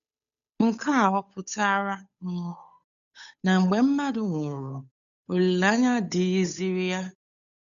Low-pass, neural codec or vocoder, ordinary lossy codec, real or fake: 7.2 kHz; codec, 16 kHz, 8 kbps, FunCodec, trained on Chinese and English, 25 frames a second; none; fake